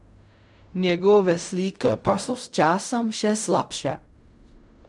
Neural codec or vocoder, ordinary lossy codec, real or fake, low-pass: codec, 16 kHz in and 24 kHz out, 0.4 kbps, LongCat-Audio-Codec, fine tuned four codebook decoder; none; fake; 10.8 kHz